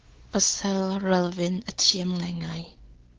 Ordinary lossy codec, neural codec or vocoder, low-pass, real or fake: Opus, 16 kbps; codec, 16 kHz, 4 kbps, X-Codec, WavLM features, trained on Multilingual LibriSpeech; 7.2 kHz; fake